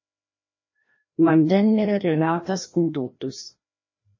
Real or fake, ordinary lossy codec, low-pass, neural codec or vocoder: fake; MP3, 32 kbps; 7.2 kHz; codec, 16 kHz, 1 kbps, FreqCodec, larger model